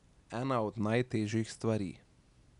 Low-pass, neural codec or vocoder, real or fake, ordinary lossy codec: 10.8 kHz; none; real; none